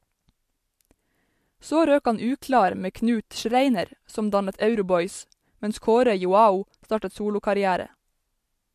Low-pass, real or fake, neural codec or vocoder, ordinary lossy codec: 14.4 kHz; real; none; MP3, 64 kbps